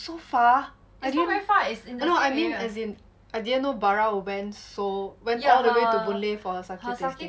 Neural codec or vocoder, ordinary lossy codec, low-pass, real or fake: none; none; none; real